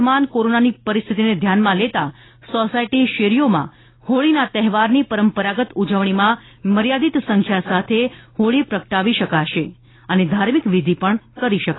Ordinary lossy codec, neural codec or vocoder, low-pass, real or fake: AAC, 16 kbps; none; 7.2 kHz; real